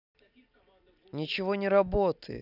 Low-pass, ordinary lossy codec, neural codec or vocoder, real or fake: 5.4 kHz; none; none; real